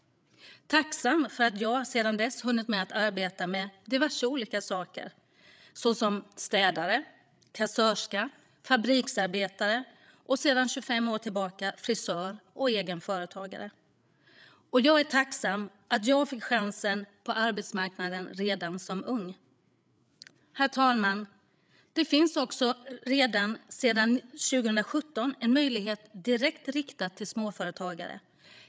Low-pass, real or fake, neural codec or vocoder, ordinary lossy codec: none; fake; codec, 16 kHz, 8 kbps, FreqCodec, larger model; none